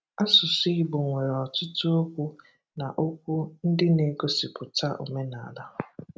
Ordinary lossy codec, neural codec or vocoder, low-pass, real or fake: none; none; none; real